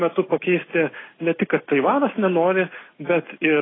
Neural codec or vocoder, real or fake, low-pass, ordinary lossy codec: none; real; 7.2 kHz; AAC, 16 kbps